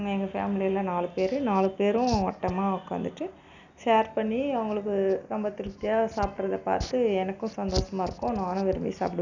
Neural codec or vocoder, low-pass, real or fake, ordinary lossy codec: none; 7.2 kHz; real; none